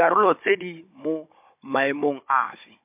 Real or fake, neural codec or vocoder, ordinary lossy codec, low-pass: fake; codec, 16 kHz, 16 kbps, FunCodec, trained on Chinese and English, 50 frames a second; MP3, 32 kbps; 3.6 kHz